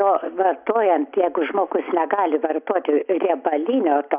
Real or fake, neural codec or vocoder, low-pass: real; none; 3.6 kHz